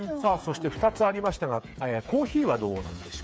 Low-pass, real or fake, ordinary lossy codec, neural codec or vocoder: none; fake; none; codec, 16 kHz, 8 kbps, FreqCodec, smaller model